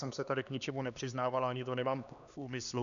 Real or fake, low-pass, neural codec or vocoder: fake; 7.2 kHz; codec, 16 kHz, 2 kbps, X-Codec, HuBERT features, trained on LibriSpeech